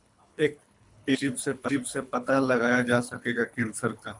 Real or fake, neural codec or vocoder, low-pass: fake; codec, 24 kHz, 3 kbps, HILCodec; 10.8 kHz